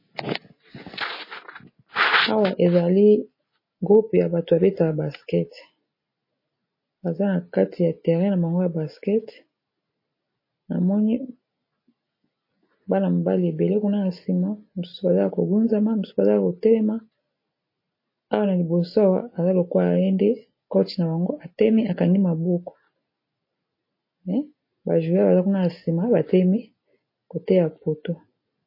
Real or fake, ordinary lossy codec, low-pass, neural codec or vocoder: real; MP3, 24 kbps; 5.4 kHz; none